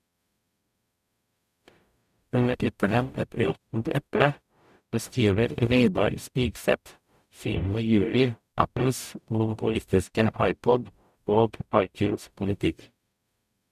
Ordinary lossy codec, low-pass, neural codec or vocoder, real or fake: none; 14.4 kHz; codec, 44.1 kHz, 0.9 kbps, DAC; fake